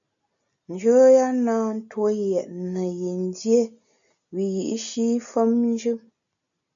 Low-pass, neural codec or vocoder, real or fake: 7.2 kHz; none; real